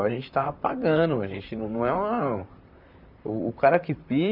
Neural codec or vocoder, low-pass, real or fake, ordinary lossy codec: vocoder, 44.1 kHz, 128 mel bands, Pupu-Vocoder; 5.4 kHz; fake; none